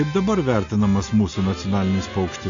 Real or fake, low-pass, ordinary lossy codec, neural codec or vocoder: real; 7.2 kHz; AAC, 64 kbps; none